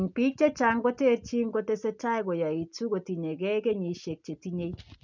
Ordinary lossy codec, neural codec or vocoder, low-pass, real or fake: none; none; 7.2 kHz; real